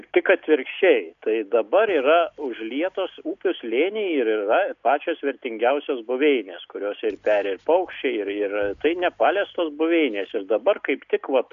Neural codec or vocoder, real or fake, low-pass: none; real; 7.2 kHz